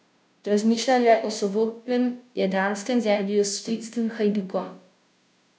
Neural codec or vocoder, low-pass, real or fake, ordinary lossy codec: codec, 16 kHz, 0.5 kbps, FunCodec, trained on Chinese and English, 25 frames a second; none; fake; none